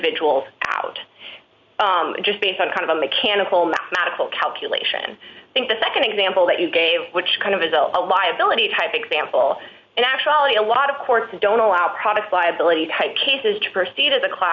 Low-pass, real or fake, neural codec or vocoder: 7.2 kHz; real; none